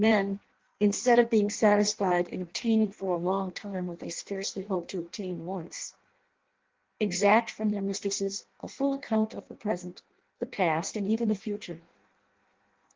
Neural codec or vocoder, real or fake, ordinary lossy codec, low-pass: codec, 16 kHz in and 24 kHz out, 0.6 kbps, FireRedTTS-2 codec; fake; Opus, 16 kbps; 7.2 kHz